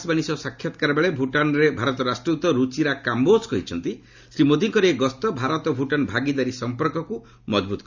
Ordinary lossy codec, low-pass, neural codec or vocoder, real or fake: Opus, 64 kbps; 7.2 kHz; none; real